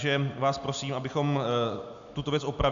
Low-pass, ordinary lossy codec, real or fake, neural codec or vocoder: 7.2 kHz; MP3, 64 kbps; real; none